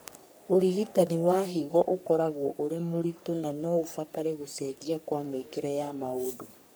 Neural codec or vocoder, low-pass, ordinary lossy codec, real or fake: codec, 44.1 kHz, 3.4 kbps, Pupu-Codec; none; none; fake